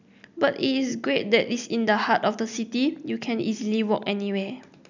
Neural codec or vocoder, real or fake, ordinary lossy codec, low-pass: none; real; none; 7.2 kHz